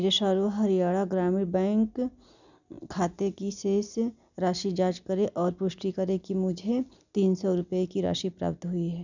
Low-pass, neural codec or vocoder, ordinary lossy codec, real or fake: 7.2 kHz; none; none; real